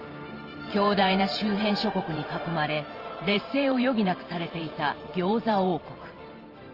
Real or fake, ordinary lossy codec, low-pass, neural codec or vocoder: fake; Opus, 24 kbps; 5.4 kHz; vocoder, 44.1 kHz, 128 mel bands every 512 samples, BigVGAN v2